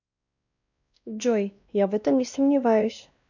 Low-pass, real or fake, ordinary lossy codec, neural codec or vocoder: 7.2 kHz; fake; none; codec, 16 kHz, 1 kbps, X-Codec, WavLM features, trained on Multilingual LibriSpeech